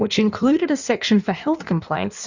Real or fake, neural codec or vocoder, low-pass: fake; codec, 16 kHz in and 24 kHz out, 1.1 kbps, FireRedTTS-2 codec; 7.2 kHz